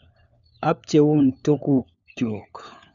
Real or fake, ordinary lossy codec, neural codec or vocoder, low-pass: fake; none; codec, 16 kHz, 4 kbps, FunCodec, trained on LibriTTS, 50 frames a second; 7.2 kHz